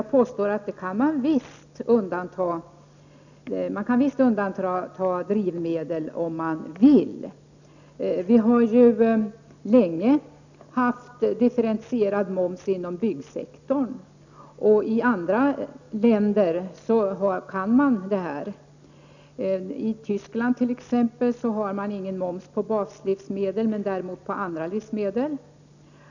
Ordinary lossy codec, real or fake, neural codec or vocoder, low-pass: none; real; none; 7.2 kHz